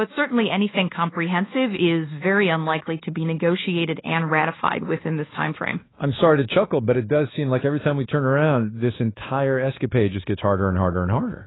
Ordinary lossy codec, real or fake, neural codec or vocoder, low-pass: AAC, 16 kbps; fake; codec, 24 kHz, 1.2 kbps, DualCodec; 7.2 kHz